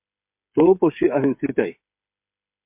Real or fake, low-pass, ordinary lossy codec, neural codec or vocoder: fake; 3.6 kHz; MP3, 32 kbps; codec, 16 kHz, 8 kbps, FreqCodec, smaller model